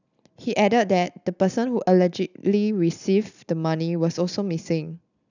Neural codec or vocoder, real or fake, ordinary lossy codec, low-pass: none; real; none; 7.2 kHz